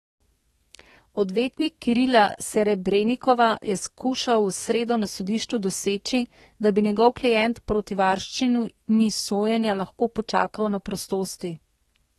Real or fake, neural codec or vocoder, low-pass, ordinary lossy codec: fake; codec, 32 kHz, 1.9 kbps, SNAC; 14.4 kHz; AAC, 32 kbps